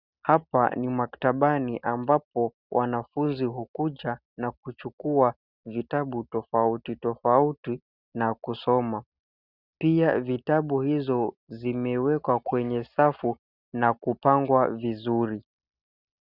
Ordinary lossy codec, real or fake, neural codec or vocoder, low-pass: Opus, 64 kbps; real; none; 5.4 kHz